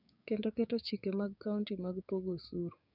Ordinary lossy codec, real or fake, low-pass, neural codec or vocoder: none; fake; 5.4 kHz; codec, 16 kHz, 6 kbps, DAC